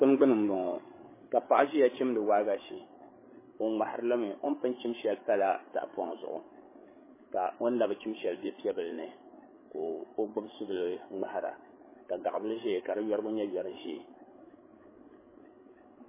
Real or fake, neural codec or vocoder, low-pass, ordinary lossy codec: fake; codec, 16 kHz, 8 kbps, FreqCodec, larger model; 3.6 kHz; MP3, 16 kbps